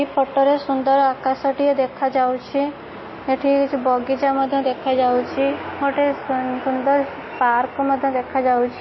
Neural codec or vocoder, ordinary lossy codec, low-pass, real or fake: none; MP3, 24 kbps; 7.2 kHz; real